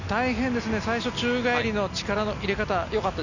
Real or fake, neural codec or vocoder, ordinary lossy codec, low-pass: real; none; none; 7.2 kHz